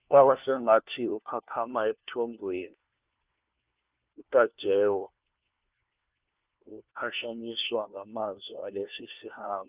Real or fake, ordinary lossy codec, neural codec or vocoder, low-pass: fake; Opus, 32 kbps; codec, 16 kHz, 1 kbps, FunCodec, trained on LibriTTS, 50 frames a second; 3.6 kHz